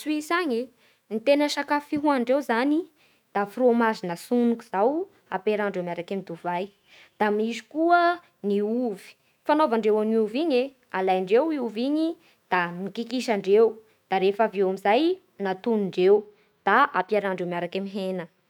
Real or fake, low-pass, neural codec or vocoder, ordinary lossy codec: fake; 19.8 kHz; autoencoder, 48 kHz, 128 numbers a frame, DAC-VAE, trained on Japanese speech; none